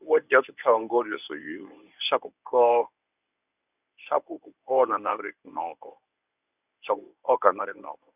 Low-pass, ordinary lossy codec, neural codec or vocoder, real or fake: 3.6 kHz; none; codec, 24 kHz, 0.9 kbps, WavTokenizer, medium speech release version 1; fake